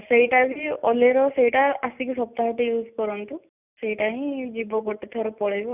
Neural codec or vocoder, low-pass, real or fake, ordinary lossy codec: none; 3.6 kHz; real; none